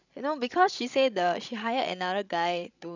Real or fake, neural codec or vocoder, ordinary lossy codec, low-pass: fake; codec, 16 kHz, 16 kbps, FreqCodec, larger model; none; 7.2 kHz